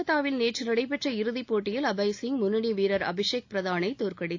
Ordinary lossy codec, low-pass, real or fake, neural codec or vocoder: AAC, 48 kbps; 7.2 kHz; real; none